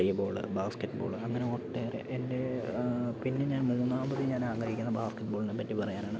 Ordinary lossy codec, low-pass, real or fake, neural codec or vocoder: none; none; real; none